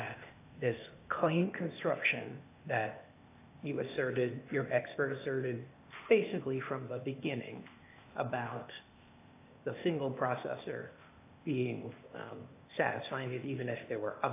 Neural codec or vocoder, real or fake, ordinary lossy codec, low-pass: codec, 16 kHz, 0.8 kbps, ZipCodec; fake; AAC, 24 kbps; 3.6 kHz